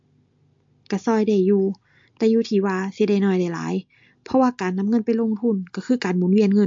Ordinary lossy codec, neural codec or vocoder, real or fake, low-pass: MP3, 48 kbps; none; real; 7.2 kHz